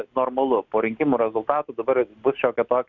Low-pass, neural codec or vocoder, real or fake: 7.2 kHz; none; real